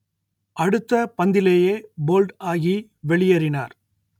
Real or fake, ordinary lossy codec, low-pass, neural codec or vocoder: real; none; 19.8 kHz; none